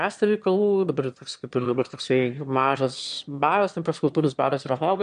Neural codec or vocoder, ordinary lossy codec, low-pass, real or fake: autoencoder, 22.05 kHz, a latent of 192 numbers a frame, VITS, trained on one speaker; MP3, 64 kbps; 9.9 kHz; fake